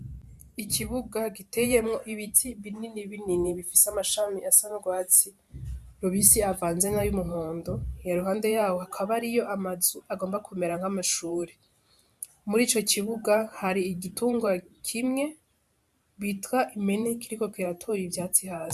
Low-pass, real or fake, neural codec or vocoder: 14.4 kHz; fake; vocoder, 44.1 kHz, 128 mel bands every 512 samples, BigVGAN v2